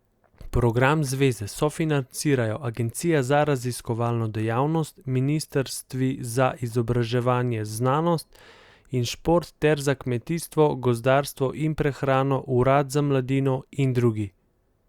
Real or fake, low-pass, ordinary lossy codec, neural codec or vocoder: real; 19.8 kHz; Opus, 64 kbps; none